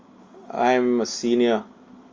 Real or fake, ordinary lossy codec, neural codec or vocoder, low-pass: real; Opus, 32 kbps; none; 7.2 kHz